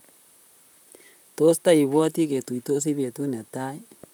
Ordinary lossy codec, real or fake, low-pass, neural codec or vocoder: none; fake; none; vocoder, 44.1 kHz, 128 mel bands, Pupu-Vocoder